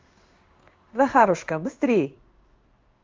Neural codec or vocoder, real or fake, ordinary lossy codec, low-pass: codec, 16 kHz in and 24 kHz out, 1 kbps, XY-Tokenizer; fake; Opus, 32 kbps; 7.2 kHz